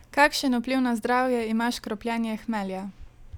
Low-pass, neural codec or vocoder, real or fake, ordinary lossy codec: 19.8 kHz; none; real; none